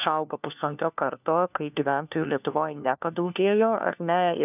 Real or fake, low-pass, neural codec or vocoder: fake; 3.6 kHz; codec, 16 kHz, 1 kbps, FunCodec, trained on LibriTTS, 50 frames a second